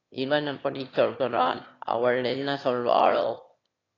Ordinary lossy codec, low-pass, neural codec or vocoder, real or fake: AAC, 32 kbps; 7.2 kHz; autoencoder, 22.05 kHz, a latent of 192 numbers a frame, VITS, trained on one speaker; fake